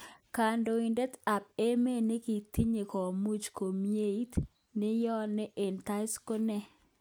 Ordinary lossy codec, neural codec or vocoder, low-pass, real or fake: none; none; none; real